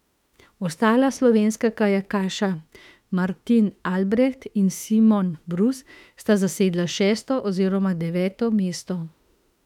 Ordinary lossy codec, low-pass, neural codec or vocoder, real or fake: none; 19.8 kHz; autoencoder, 48 kHz, 32 numbers a frame, DAC-VAE, trained on Japanese speech; fake